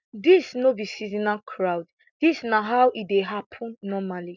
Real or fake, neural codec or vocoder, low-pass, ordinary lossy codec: real; none; 7.2 kHz; none